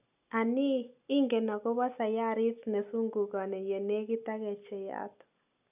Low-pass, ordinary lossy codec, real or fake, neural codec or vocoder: 3.6 kHz; none; real; none